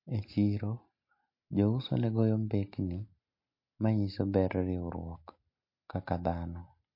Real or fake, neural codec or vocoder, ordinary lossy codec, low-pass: real; none; MP3, 32 kbps; 5.4 kHz